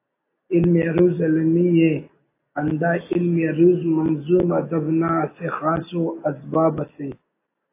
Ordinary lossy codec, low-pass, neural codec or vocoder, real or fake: AAC, 24 kbps; 3.6 kHz; none; real